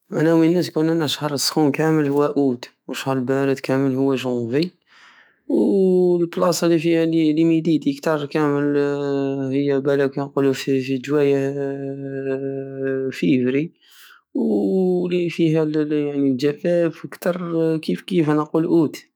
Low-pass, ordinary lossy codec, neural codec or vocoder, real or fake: none; none; autoencoder, 48 kHz, 128 numbers a frame, DAC-VAE, trained on Japanese speech; fake